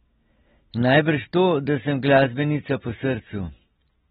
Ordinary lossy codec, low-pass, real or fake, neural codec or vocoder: AAC, 16 kbps; 19.8 kHz; real; none